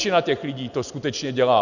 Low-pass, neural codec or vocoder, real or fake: 7.2 kHz; none; real